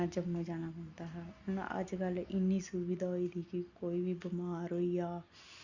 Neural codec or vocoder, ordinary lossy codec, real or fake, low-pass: none; Opus, 64 kbps; real; 7.2 kHz